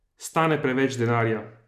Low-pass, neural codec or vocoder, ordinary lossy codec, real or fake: 14.4 kHz; none; none; real